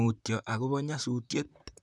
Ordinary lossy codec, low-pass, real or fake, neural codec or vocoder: none; 10.8 kHz; fake; vocoder, 44.1 kHz, 128 mel bands, Pupu-Vocoder